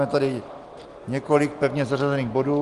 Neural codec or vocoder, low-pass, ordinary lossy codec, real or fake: none; 10.8 kHz; Opus, 24 kbps; real